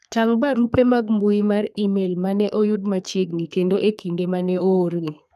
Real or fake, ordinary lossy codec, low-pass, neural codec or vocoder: fake; none; 14.4 kHz; codec, 32 kHz, 1.9 kbps, SNAC